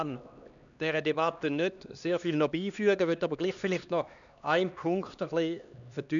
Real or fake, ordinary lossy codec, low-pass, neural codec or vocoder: fake; none; 7.2 kHz; codec, 16 kHz, 2 kbps, X-Codec, HuBERT features, trained on LibriSpeech